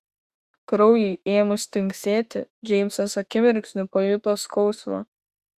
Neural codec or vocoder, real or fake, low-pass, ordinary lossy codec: autoencoder, 48 kHz, 32 numbers a frame, DAC-VAE, trained on Japanese speech; fake; 14.4 kHz; Opus, 64 kbps